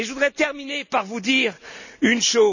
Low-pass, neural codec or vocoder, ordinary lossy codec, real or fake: 7.2 kHz; none; none; real